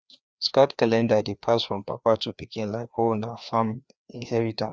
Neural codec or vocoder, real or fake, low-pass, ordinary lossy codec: codec, 16 kHz, 2 kbps, FreqCodec, larger model; fake; none; none